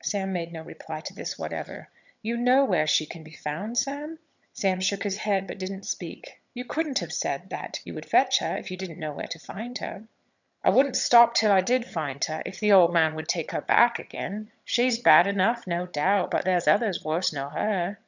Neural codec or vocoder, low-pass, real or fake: vocoder, 22.05 kHz, 80 mel bands, HiFi-GAN; 7.2 kHz; fake